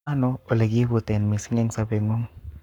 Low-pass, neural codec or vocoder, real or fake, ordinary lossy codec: 19.8 kHz; codec, 44.1 kHz, 7.8 kbps, Pupu-Codec; fake; none